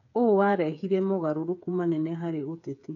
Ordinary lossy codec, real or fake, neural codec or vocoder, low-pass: none; fake; codec, 16 kHz, 8 kbps, FreqCodec, smaller model; 7.2 kHz